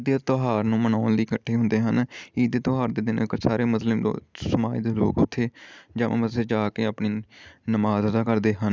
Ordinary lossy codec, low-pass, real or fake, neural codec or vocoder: none; none; real; none